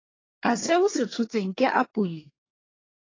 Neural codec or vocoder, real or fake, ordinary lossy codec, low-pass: codec, 24 kHz, 1 kbps, SNAC; fake; AAC, 32 kbps; 7.2 kHz